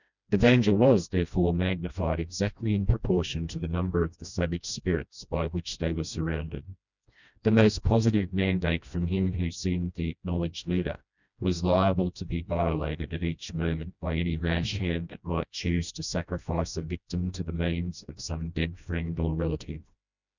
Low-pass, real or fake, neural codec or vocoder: 7.2 kHz; fake; codec, 16 kHz, 1 kbps, FreqCodec, smaller model